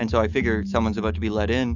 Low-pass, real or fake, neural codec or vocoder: 7.2 kHz; fake; autoencoder, 48 kHz, 128 numbers a frame, DAC-VAE, trained on Japanese speech